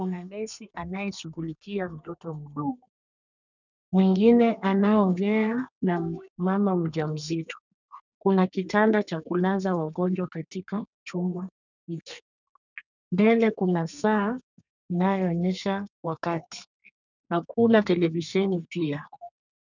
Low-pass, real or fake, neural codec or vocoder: 7.2 kHz; fake; codec, 32 kHz, 1.9 kbps, SNAC